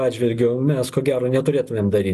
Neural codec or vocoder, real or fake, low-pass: none; real; 14.4 kHz